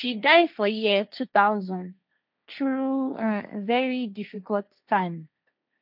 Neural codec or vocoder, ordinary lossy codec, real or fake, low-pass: codec, 16 kHz, 1.1 kbps, Voila-Tokenizer; none; fake; 5.4 kHz